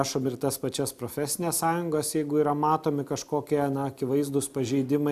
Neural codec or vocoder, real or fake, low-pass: none; real; 14.4 kHz